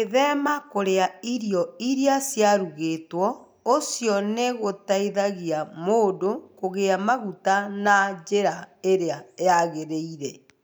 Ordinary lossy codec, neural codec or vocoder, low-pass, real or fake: none; none; none; real